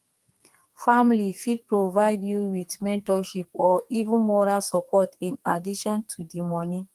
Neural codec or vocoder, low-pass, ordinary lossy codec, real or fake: codec, 32 kHz, 1.9 kbps, SNAC; 14.4 kHz; Opus, 32 kbps; fake